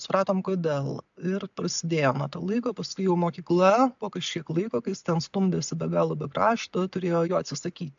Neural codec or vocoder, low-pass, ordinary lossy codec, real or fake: none; 7.2 kHz; AAC, 64 kbps; real